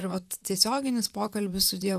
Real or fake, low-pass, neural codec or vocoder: fake; 14.4 kHz; vocoder, 44.1 kHz, 128 mel bands, Pupu-Vocoder